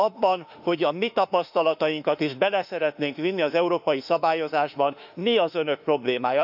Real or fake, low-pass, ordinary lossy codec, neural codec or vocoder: fake; 5.4 kHz; none; autoencoder, 48 kHz, 32 numbers a frame, DAC-VAE, trained on Japanese speech